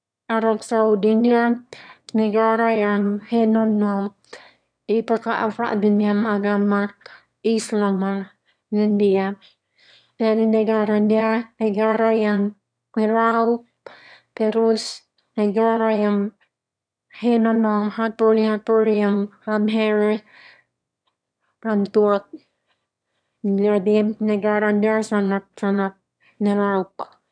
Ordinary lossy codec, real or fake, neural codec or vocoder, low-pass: none; fake; autoencoder, 22.05 kHz, a latent of 192 numbers a frame, VITS, trained on one speaker; 9.9 kHz